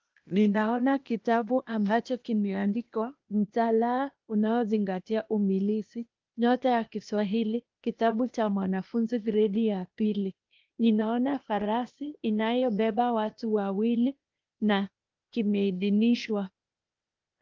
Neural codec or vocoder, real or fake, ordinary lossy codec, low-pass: codec, 16 kHz, 0.8 kbps, ZipCodec; fake; Opus, 24 kbps; 7.2 kHz